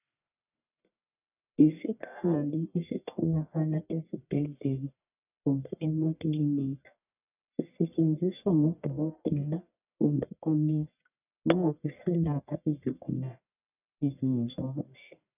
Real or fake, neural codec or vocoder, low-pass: fake; codec, 44.1 kHz, 1.7 kbps, Pupu-Codec; 3.6 kHz